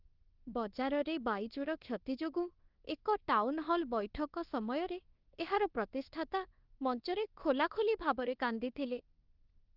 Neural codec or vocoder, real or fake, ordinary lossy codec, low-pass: codec, 24 kHz, 1.2 kbps, DualCodec; fake; Opus, 16 kbps; 5.4 kHz